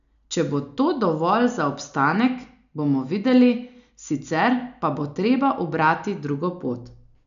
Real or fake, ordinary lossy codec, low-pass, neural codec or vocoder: real; none; 7.2 kHz; none